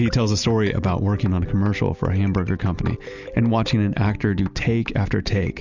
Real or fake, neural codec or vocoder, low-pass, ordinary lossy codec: real; none; 7.2 kHz; Opus, 64 kbps